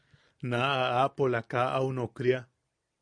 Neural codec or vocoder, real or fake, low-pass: none; real; 9.9 kHz